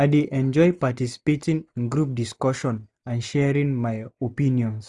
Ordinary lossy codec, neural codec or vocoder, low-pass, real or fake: none; none; none; real